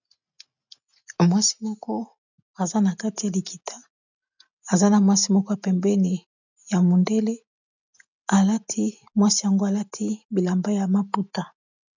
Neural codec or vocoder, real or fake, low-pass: none; real; 7.2 kHz